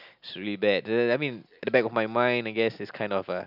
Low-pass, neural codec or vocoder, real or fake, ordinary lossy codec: 5.4 kHz; none; real; none